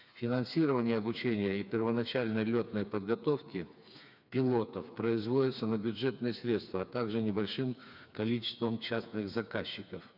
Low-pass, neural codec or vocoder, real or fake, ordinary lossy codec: 5.4 kHz; codec, 16 kHz, 4 kbps, FreqCodec, smaller model; fake; none